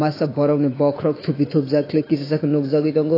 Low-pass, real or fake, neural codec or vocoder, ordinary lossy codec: 5.4 kHz; fake; autoencoder, 48 kHz, 128 numbers a frame, DAC-VAE, trained on Japanese speech; AAC, 24 kbps